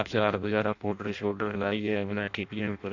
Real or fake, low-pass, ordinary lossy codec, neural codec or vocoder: fake; 7.2 kHz; AAC, 48 kbps; codec, 16 kHz in and 24 kHz out, 0.6 kbps, FireRedTTS-2 codec